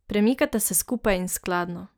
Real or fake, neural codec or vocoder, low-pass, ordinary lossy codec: real; none; none; none